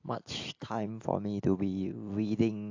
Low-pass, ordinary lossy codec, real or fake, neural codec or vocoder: 7.2 kHz; AAC, 48 kbps; real; none